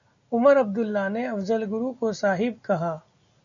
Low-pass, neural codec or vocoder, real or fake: 7.2 kHz; none; real